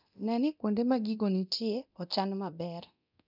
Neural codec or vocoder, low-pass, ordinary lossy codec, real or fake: codec, 24 kHz, 0.9 kbps, DualCodec; 5.4 kHz; none; fake